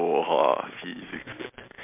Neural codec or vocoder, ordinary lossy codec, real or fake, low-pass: none; MP3, 32 kbps; real; 3.6 kHz